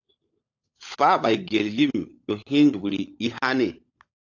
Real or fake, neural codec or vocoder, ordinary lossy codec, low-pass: fake; codec, 16 kHz, 16 kbps, FunCodec, trained on LibriTTS, 50 frames a second; AAC, 48 kbps; 7.2 kHz